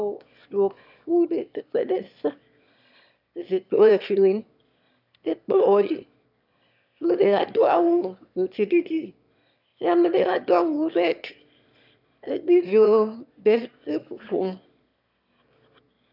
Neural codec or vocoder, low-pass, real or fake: autoencoder, 22.05 kHz, a latent of 192 numbers a frame, VITS, trained on one speaker; 5.4 kHz; fake